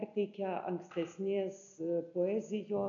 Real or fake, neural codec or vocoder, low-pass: real; none; 7.2 kHz